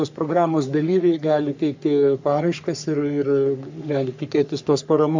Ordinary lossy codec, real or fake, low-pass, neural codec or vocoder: MP3, 64 kbps; fake; 7.2 kHz; codec, 44.1 kHz, 3.4 kbps, Pupu-Codec